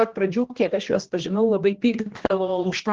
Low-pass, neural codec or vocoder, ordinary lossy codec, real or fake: 7.2 kHz; codec, 16 kHz, 1 kbps, X-Codec, HuBERT features, trained on general audio; Opus, 16 kbps; fake